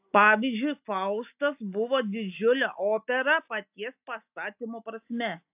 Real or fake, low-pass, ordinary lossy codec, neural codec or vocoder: real; 3.6 kHz; AAC, 32 kbps; none